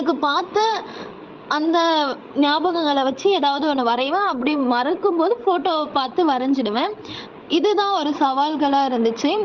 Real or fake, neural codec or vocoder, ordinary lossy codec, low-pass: fake; codec, 16 kHz, 16 kbps, FreqCodec, larger model; Opus, 32 kbps; 7.2 kHz